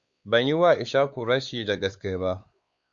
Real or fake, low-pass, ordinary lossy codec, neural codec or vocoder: fake; 7.2 kHz; Opus, 64 kbps; codec, 16 kHz, 4 kbps, X-Codec, WavLM features, trained on Multilingual LibriSpeech